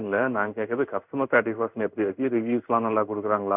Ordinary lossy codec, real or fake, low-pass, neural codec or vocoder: none; fake; 3.6 kHz; codec, 16 kHz in and 24 kHz out, 1 kbps, XY-Tokenizer